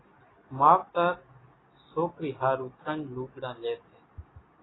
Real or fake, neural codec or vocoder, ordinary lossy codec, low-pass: real; none; AAC, 16 kbps; 7.2 kHz